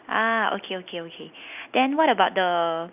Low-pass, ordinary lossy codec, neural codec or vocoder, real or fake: 3.6 kHz; none; none; real